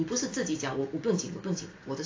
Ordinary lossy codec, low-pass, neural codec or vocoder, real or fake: AAC, 32 kbps; 7.2 kHz; none; real